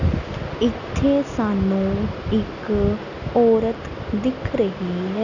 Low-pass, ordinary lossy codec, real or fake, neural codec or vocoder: 7.2 kHz; none; real; none